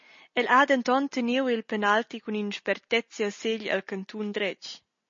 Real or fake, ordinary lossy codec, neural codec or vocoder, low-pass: real; MP3, 32 kbps; none; 7.2 kHz